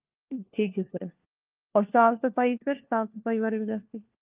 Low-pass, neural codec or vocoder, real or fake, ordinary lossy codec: 3.6 kHz; codec, 16 kHz, 1 kbps, FunCodec, trained on LibriTTS, 50 frames a second; fake; Opus, 24 kbps